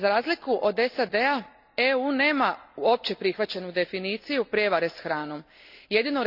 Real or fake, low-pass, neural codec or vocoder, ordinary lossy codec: real; 5.4 kHz; none; none